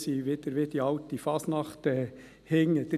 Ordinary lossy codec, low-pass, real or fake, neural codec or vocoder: none; 14.4 kHz; real; none